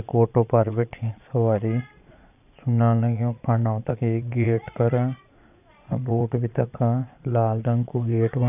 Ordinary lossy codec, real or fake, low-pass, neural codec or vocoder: none; fake; 3.6 kHz; vocoder, 22.05 kHz, 80 mel bands, Vocos